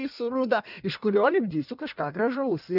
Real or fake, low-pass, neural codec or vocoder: fake; 5.4 kHz; codec, 16 kHz in and 24 kHz out, 1.1 kbps, FireRedTTS-2 codec